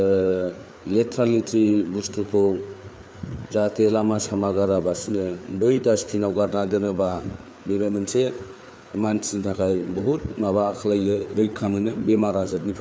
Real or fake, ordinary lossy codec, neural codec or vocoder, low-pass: fake; none; codec, 16 kHz, 4 kbps, FreqCodec, larger model; none